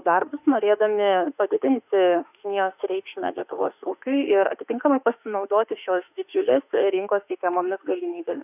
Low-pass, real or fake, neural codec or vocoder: 3.6 kHz; fake; autoencoder, 48 kHz, 32 numbers a frame, DAC-VAE, trained on Japanese speech